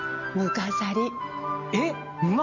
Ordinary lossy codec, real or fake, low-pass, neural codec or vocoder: none; real; 7.2 kHz; none